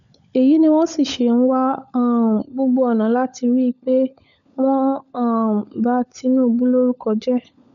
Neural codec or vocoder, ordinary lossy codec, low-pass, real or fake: codec, 16 kHz, 16 kbps, FunCodec, trained on LibriTTS, 50 frames a second; none; 7.2 kHz; fake